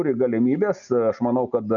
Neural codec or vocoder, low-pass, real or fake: none; 7.2 kHz; real